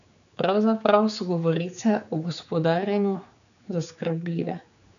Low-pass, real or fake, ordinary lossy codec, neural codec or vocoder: 7.2 kHz; fake; none; codec, 16 kHz, 4 kbps, X-Codec, HuBERT features, trained on general audio